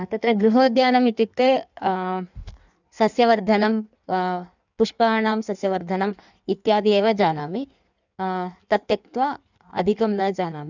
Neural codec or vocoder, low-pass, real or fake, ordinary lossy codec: codec, 16 kHz in and 24 kHz out, 1.1 kbps, FireRedTTS-2 codec; 7.2 kHz; fake; none